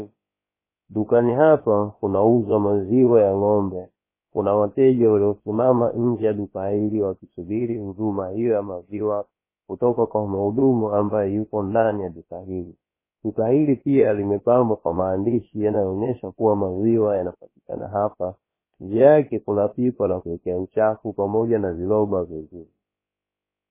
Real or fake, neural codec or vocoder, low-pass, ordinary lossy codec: fake; codec, 16 kHz, about 1 kbps, DyCAST, with the encoder's durations; 3.6 kHz; MP3, 16 kbps